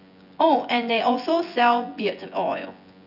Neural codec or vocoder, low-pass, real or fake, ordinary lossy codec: vocoder, 24 kHz, 100 mel bands, Vocos; 5.4 kHz; fake; none